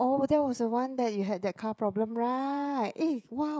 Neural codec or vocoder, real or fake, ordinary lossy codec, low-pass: codec, 16 kHz, 16 kbps, FreqCodec, smaller model; fake; none; none